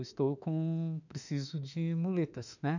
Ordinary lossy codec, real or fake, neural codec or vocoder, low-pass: none; fake; autoencoder, 48 kHz, 32 numbers a frame, DAC-VAE, trained on Japanese speech; 7.2 kHz